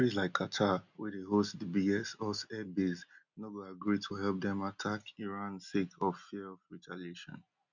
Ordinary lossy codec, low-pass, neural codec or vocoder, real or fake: none; 7.2 kHz; none; real